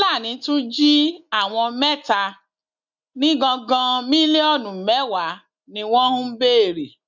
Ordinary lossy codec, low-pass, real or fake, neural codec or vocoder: none; 7.2 kHz; real; none